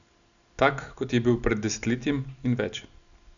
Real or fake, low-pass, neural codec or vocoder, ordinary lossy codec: real; 7.2 kHz; none; none